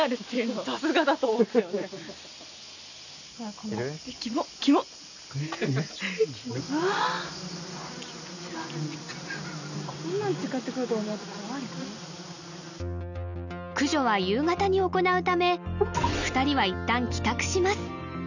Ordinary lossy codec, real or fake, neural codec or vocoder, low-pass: none; real; none; 7.2 kHz